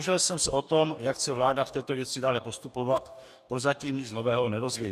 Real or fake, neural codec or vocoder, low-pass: fake; codec, 44.1 kHz, 2.6 kbps, DAC; 14.4 kHz